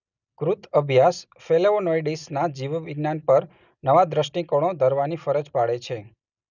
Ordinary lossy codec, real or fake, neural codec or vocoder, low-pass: none; real; none; 7.2 kHz